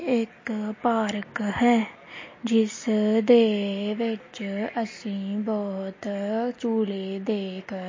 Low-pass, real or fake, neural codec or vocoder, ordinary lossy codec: 7.2 kHz; real; none; MP3, 32 kbps